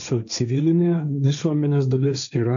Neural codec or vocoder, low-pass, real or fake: codec, 16 kHz, 1.1 kbps, Voila-Tokenizer; 7.2 kHz; fake